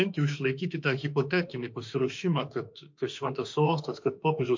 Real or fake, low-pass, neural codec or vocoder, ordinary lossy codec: fake; 7.2 kHz; autoencoder, 48 kHz, 32 numbers a frame, DAC-VAE, trained on Japanese speech; MP3, 48 kbps